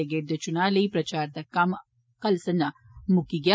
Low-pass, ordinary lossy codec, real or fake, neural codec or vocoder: none; none; real; none